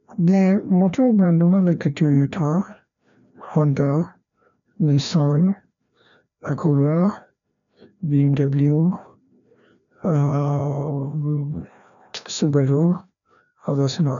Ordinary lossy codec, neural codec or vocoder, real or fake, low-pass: none; codec, 16 kHz, 1 kbps, FreqCodec, larger model; fake; 7.2 kHz